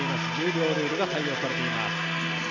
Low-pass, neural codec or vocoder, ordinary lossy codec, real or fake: 7.2 kHz; none; none; real